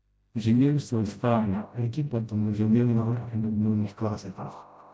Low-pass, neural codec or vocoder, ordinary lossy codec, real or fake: none; codec, 16 kHz, 0.5 kbps, FreqCodec, smaller model; none; fake